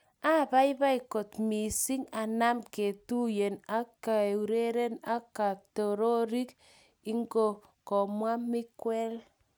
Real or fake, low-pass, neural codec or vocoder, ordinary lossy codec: real; none; none; none